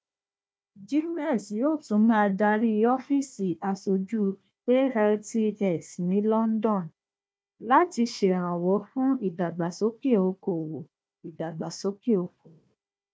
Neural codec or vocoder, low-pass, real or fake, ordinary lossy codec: codec, 16 kHz, 1 kbps, FunCodec, trained on Chinese and English, 50 frames a second; none; fake; none